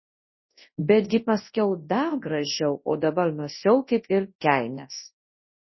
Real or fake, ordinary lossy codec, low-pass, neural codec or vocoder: fake; MP3, 24 kbps; 7.2 kHz; codec, 24 kHz, 0.9 kbps, WavTokenizer, large speech release